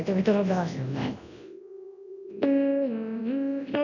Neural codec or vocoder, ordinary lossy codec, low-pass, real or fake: codec, 24 kHz, 0.9 kbps, WavTokenizer, large speech release; none; 7.2 kHz; fake